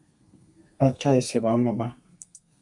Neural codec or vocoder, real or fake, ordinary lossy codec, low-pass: codec, 32 kHz, 1.9 kbps, SNAC; fake; MP3, 96 kbps; 10.8 kHz